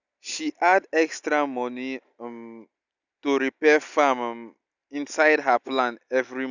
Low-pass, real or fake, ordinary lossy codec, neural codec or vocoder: 7.2 kHz; real; none; none